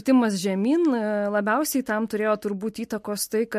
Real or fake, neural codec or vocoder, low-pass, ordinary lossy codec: real; none; 14.4 kHz; MP3, 64 kbps